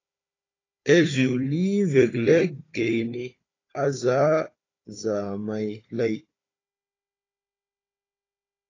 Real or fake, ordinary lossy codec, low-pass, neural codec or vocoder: fake; AAC, 32 kbps; 7.2 kHz; codec, 16 kHz, 4 kbps, FunCodec, trained on Chinese and English, 50 frames a second